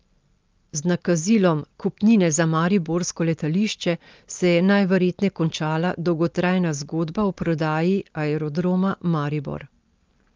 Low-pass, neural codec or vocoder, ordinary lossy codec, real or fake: 7.2 kHz; none; Opus, 32 kbps; real